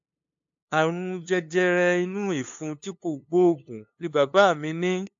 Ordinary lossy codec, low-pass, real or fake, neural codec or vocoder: AAC, 64 kbps; 7.2 kHz; fake; codec, 16 kHz, 2 kbps, FunCodec, trained on LibriTTS, 25 frames a second